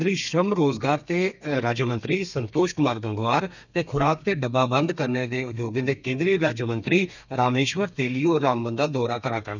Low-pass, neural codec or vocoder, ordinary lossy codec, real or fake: 7.2 kHz; codec, 32 kHz, 1.9 kbps, SNAC; none; fake